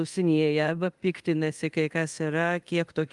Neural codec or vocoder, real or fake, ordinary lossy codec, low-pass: codec, 24 kHz, 0.5 kbps, DualCodec; fake; Opus, 32 kbps; 10.8 kHz